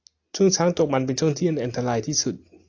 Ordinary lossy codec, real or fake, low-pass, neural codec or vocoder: AAC, 48 kbps; real; 7.2 kHz; none